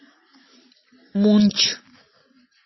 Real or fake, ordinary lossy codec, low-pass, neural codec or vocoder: real; MP3, 24 kbps; 7.2 kHz; none